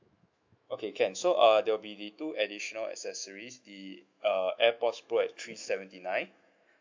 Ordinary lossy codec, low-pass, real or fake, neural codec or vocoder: MP3, 64 kbps; 7.2 kHz; fake; autoencoder, 48 kHz, 128 numbers a frame, DAC-VAE, trained on Japanese speech